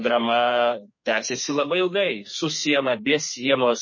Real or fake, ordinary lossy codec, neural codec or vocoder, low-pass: fake; MP3, 32 kbps; codec, 32 kHz, 1.9 kbps, SNAC; 7.2 kHz